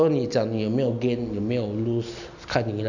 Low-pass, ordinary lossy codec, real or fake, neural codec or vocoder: 7.2 kHz; none; real; none